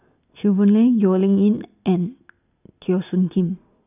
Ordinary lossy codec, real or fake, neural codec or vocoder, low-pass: none; real; none; 3.6 kHz